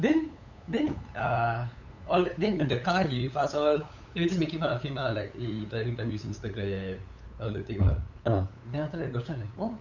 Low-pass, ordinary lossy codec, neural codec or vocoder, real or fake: 7.2 kHz; none; codec, 16 kHz, 8 kbps, FunCodec, trained on LibriTTS, 25 frames a second; fake